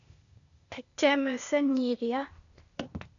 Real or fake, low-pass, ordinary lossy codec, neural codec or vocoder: fake; 7.2 kHz; MP3, 96 kbps; codec, 16 kHz, 0.8 kbps, ZipCodec